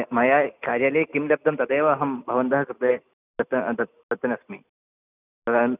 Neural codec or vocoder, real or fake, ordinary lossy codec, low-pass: none; real; none; 3.6 kHz